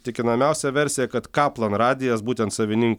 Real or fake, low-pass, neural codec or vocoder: real; 19.8 kHz; none